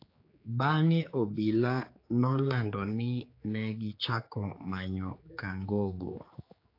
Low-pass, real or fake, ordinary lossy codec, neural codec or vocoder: 5.4 kHz; fake; AAC, 32 kbps; codec, 16 kHz, 4 kbps, X-Codec, HuBERT features, trained on general audio